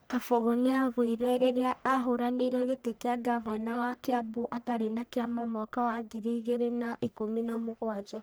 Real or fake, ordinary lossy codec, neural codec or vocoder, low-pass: fake; none; codec, 44.1 kHz, 1.7 kbps, Pupu-Codec; none